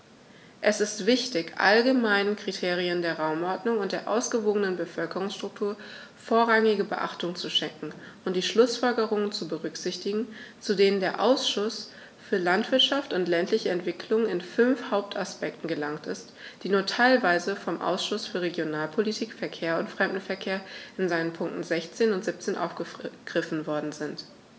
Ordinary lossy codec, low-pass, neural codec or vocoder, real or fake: none; none; none; real